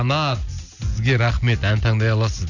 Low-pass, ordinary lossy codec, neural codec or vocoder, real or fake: 7.2 kHz; none; none; real